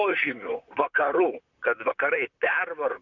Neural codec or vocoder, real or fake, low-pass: vocoder, 44.1 kHz, 128 mel bands, Pupu-Vocoder; fake; 7.2 kHz